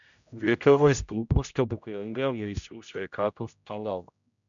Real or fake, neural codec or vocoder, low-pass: fake; codec, 16 kHz, 0.5 kbps, X-Codec, HuBERT features, trained on general audio; 7.2 kHz